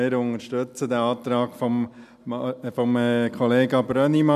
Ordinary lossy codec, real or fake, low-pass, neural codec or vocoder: none; real; 14.4 kHz; none